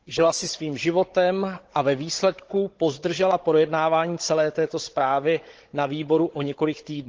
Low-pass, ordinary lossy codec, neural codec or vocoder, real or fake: 7.2 kHz; Opus, 16 kbps; codec, 16 kHz, 16 kbps, FunCodec, trained on Chinese and English, 50 frames a second; fake